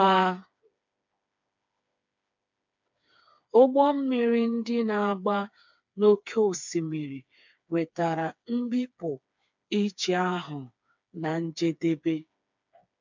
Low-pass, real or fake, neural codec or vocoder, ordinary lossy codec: 7.2 kHz; fake; codec, 16 kHz, 4 kbps, FreqCodec, smaller model; MP3, 64 kbps